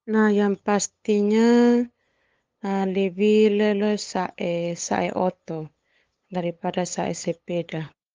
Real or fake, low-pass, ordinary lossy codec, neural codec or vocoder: fake; 7.2 kHz; Opus, 32 kbps; codec, 16 kHz, 8 kbps, FunCodec, trained on LibriTTS, 25 frames a second